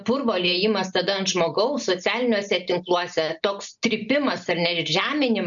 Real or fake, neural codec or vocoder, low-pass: real; none; 7.2 kHz